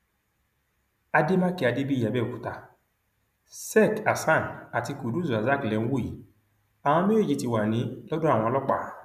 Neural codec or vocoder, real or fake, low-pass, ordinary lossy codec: none; real; 14.4 kHz; none